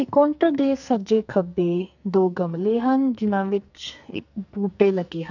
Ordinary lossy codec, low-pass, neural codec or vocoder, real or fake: none; 7.2 kHz; codec, 44.1 kHz, 2.6 kbps, SNAC; fake